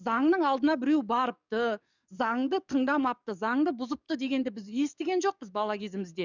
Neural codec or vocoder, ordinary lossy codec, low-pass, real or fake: codec, 44.1 kHz, 7.8 kbps, DAC; none; 7.2 kHz; fake